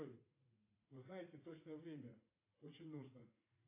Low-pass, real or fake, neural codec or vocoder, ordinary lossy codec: 3.6 kHz; fake; vocoder, 44.1 kHz, 80 mel bands, Vocos; AAC, 24 kbps